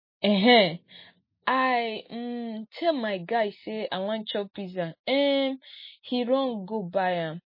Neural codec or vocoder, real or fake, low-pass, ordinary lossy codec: none; real; 5.4 kHz; MP3, 24 kbps